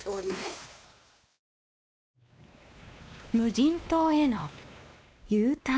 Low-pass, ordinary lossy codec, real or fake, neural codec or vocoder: none; none; fake; codec, 16 kHz, 2 kbps, X-Codec, WavLM features, trained on Multilingual LibriSpeech